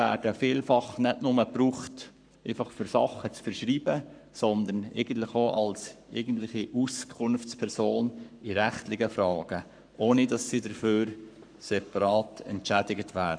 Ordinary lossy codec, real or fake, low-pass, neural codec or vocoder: none; fake; 9.9 kHz; codec, 44.1 kHz, 7.8 kbps, Pupu-Codec